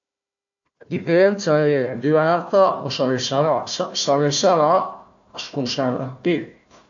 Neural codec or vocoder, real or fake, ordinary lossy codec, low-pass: codec, 16 kHz, 1 kbps, FunCodec, trained on Chinese and English, 50 frames a second; fake; AAC, 64 kbps; 7.2 kHz